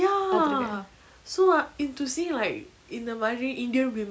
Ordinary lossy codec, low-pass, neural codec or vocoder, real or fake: none; none; none; real